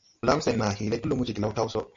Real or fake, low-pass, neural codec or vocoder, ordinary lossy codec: real; 7.2 kHz; none; MP3, 48 kbps